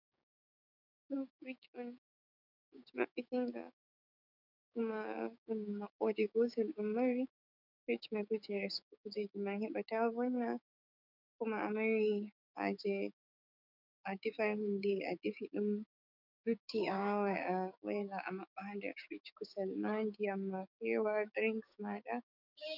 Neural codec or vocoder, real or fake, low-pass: codec, 16 kHz, 6 kbps, DAC; fake; 5.4 kHz